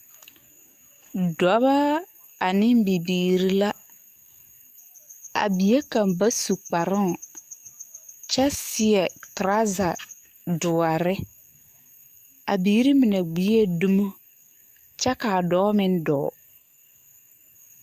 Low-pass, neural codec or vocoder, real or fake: 14.4 kHz; codec, 44.1 kHz, 7.8 kbps, DAC; fake